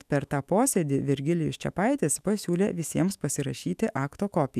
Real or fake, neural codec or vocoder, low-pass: fake; autoencoder, 48 kHz, 128 numbers a frame, DAC-VAE, trained on Japanese speech; 14.4 kHz